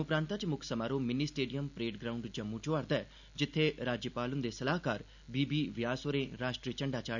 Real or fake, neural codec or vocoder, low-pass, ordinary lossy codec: real; none; 7.2 kHz; none